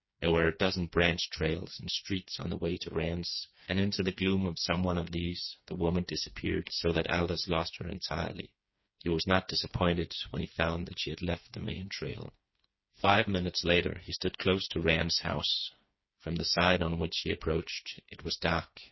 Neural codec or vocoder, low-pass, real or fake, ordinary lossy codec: codec, 16 kHz, 4 kbps, FreqCodec, smaller model; 7.2 kHz; fake; MP3, 24 kbps